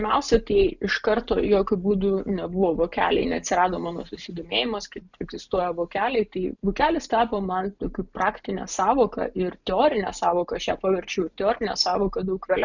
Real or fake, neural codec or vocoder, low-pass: real; none; 7.2 kHz